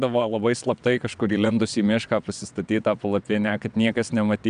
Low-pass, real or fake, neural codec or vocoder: 9.9 kHz; fake; vocoder, 22.05 kHz, 80 mel bands, WaveNeXt